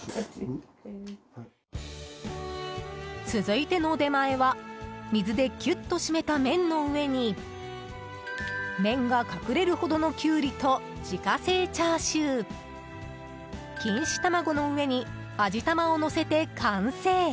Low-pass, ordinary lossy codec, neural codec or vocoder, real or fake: none; none; none; real